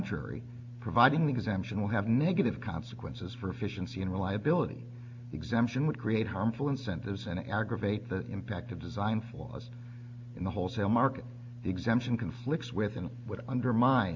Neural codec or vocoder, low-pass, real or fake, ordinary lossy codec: none; 7.2 kHz; real; MP3, 64 kbps